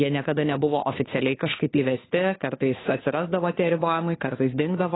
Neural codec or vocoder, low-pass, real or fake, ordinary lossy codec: codec, 16 kHz, 2 kbps, FunCodec, trained on Chinese and English, 25 frames a second; 7.2 kHz; fake; AAC, 16 kbps